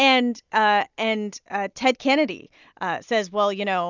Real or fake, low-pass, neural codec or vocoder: real; 7.2 kHz; none